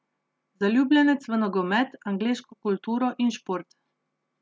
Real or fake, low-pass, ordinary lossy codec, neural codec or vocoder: real; none; none; none